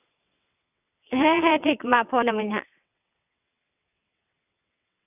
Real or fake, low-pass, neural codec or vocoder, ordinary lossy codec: fake; 3.6 kHz; vocoder, 22.05 kHz, 80 mel bands, WaveNeXt; none